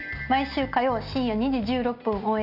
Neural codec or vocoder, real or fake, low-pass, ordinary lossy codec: none; real; 5.4 kHz; none